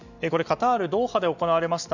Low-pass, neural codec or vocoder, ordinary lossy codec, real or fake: 7.2 kHz; none; none; real